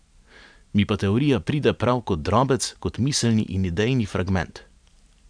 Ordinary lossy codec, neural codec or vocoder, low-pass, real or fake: none; vocoder, 44.1 kHz, 128 mel bands every 512 samples, BigVGAN v2; 9.9 kHz; fake